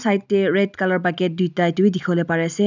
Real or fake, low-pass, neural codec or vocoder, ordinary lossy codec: real; 7.2 kHz; none; none